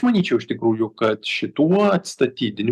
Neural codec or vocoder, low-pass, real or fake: none; 14.4 kHz; real